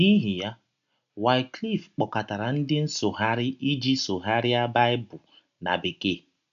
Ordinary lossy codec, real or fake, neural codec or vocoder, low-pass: none; real; none; 7.2 kHz